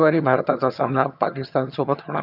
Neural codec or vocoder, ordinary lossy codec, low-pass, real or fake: vocoder, 22.05 kHz, 80 mel bands, HiFi-GAN; none; 5.4 kHz; fake